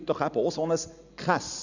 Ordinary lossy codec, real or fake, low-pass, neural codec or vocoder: none; real; 7.2 kHz; none